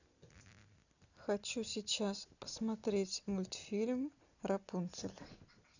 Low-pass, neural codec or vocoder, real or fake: 7.2 kHz; none; real